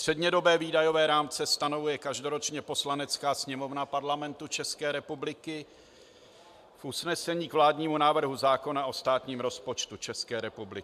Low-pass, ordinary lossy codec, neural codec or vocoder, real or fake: 14.4 kHz; AAC, 96 kbps; none; real